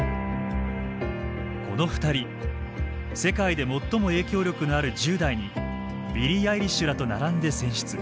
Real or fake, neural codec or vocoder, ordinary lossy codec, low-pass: real; none; none; none